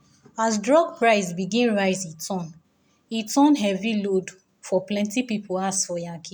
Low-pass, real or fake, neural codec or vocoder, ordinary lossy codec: none; real; none; none